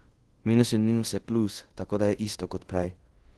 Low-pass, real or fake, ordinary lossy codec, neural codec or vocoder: 10.8 kHz; fake; Opus, 16 kbps; codec, 24 kHz, 0.5 kbps, DualCodec